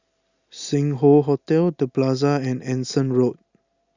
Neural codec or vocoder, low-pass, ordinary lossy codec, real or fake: none; 7.2 kHz; Opus, 64 kbps; real